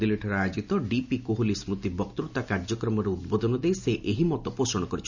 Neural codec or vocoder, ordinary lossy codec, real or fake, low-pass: none; none; real; 7.2 kHz